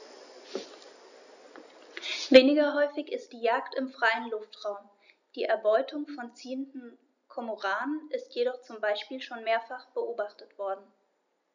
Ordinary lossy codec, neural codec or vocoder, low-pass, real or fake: none; none; 7.2 kHz; real